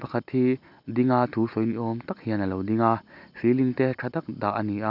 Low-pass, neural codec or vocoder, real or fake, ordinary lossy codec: 5.4 kHz; none; real; none